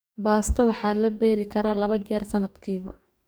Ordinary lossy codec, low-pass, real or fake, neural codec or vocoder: none; none; fake; codec, 44.1 kHz, 2.6 kbps, DAC